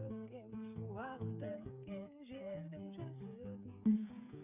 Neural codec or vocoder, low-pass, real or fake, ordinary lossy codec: codec, 16 kHz, 8 kbps, FreqCodec, smaller model; 3.6 kHz; fake; none